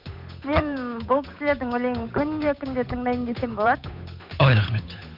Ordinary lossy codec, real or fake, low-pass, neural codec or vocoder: none; fake; 5.4 kHz; codec, 16 kHz, 8 kbps, FunCodec, trained on Chinese and English, 25 frames a second